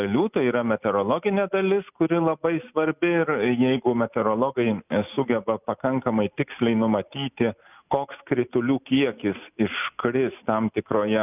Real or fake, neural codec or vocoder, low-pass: real; none; 3.6 kHz